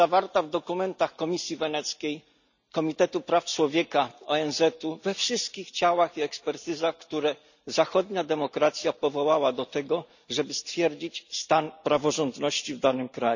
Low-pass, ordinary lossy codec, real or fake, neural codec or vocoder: 7.2 kHz; none; real; none